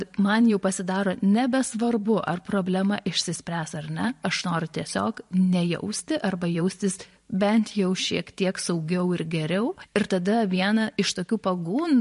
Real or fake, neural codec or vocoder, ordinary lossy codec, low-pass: fake; vocoder, 44.1 kHz, 128 mel bands every 512 samples, BigVGAN v2; MP3, 48 kbps; 14.4 kHz